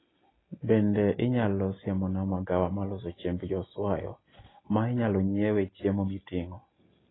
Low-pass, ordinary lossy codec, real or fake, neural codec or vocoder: 7.2 kHz; AAC, 16 kbps; real; none